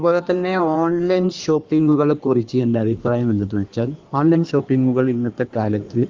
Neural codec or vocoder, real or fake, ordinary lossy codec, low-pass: codec, 16 kHz in and 24 kHz out, 1.1 kbps, FireRedTTS-2 codec; fake; Opus, 32 kbps; 7.2 kHz